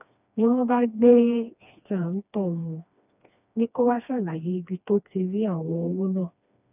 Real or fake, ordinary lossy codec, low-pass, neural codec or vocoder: fake; none; 3.6 kHz; codec, 16 kHz, 2 kbps, FreqCodec, smaller model